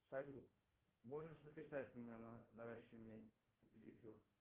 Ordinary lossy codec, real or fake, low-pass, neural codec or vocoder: Opus, 16 kbps; fake; 3.6 kHz; codec, 16 kHz, 1 kbps, FunCodec, trained on Chinese and English, 50 frames a second